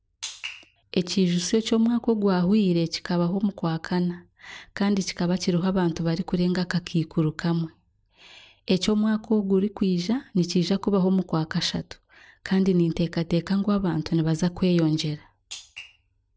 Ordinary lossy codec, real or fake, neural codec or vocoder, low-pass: none; real; none; none